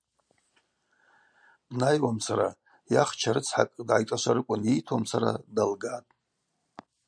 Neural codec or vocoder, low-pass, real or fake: none; 9.9 kHz; real